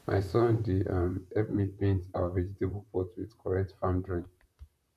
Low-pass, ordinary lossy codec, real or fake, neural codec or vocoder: 14.4 kHz; none; fake; vocoder, 44.1 kHz, 128 mel bands, Pupu-Vocoder